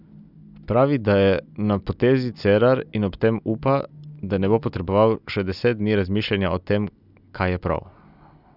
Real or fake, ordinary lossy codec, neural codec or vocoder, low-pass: real; none; none; 5.4 kHz